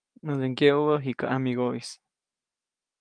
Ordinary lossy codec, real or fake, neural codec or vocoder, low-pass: Opus, 32 kbps; fake; autoencoder, 48 kHz, 128 numbers a frame, DAC-VAE, trained on Japanese speech; 9.9 kHz